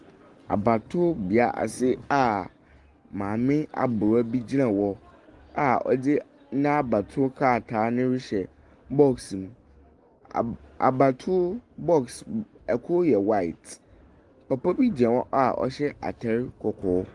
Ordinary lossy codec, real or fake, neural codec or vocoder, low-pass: Opus, 24 kbps; real; none; 10.8 kHz